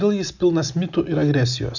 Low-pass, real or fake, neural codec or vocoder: 7.2 kHz; real; none